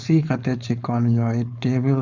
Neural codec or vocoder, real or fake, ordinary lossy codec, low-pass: codec, 16 kHz, 4.8 kbps, FACodec; fake; none; 7.2 kHz